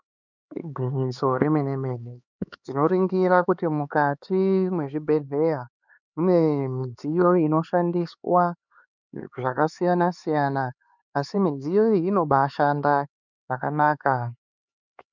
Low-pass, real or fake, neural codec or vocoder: 7.2 kHz; fake; codec, 16 kHz, 4 kbps, X-Codec, HuBERT features, trained on LibriSpeech